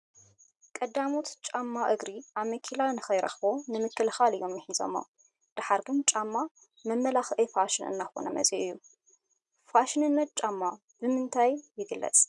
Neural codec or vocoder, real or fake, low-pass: none; real; 10.8 kHz